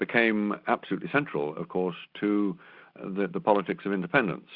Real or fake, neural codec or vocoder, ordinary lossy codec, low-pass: real; none; Opus, 64 kbps; 5.4 kHz